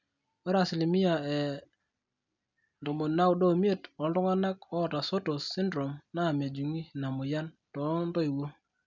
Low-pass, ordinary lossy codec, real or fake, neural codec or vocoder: 7.2 kHz; none; real; none